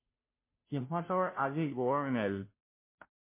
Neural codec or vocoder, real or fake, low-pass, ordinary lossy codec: codec, 16 kHz, 0.5 kbps, FunCodec, trained on Chinese and English, 25 frames a second; fake; 3.6 kHz; MP3, 24 kbps